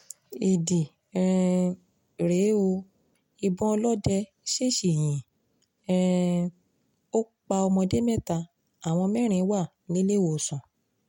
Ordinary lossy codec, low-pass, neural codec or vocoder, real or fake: MP3, 64 kbps; 19.8 kHz; none; real